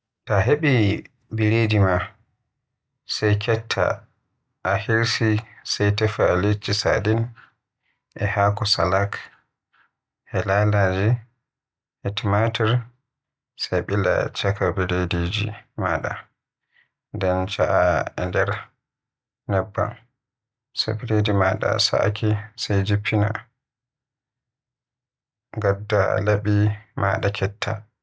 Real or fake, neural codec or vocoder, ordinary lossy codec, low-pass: real; none; none; none